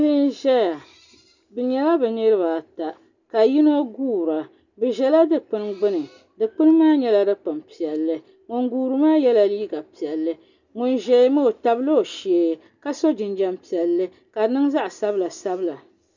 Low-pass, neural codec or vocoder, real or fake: 7.2 kHz; none; real